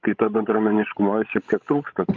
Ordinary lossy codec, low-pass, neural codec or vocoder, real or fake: Opus, 24 kbps; 7.2 kHz; codec, 16 kHz, 16 kbps, FreqCodec, larger model; fake